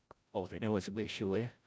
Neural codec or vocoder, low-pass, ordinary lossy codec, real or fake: codec, 16 kHz, 0.5 kbps, FreqCodec, larger model; none; none; fake